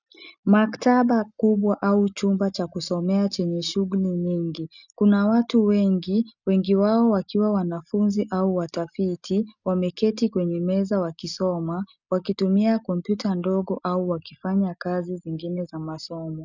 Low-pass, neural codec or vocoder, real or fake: 7.2 kHz; none; real